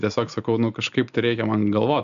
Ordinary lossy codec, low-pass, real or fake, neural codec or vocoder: AAC, 96 kbps; 7.2 kHz; real; none